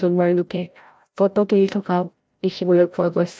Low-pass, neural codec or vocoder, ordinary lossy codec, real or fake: none; codec, 16 kHz, 0.5 kbps, FreqCodec, larger model; none; fake